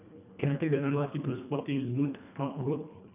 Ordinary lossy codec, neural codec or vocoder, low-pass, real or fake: none; codec, 24 kHz, 1.5 kbps, HILCodec; 3.6 kHz; fake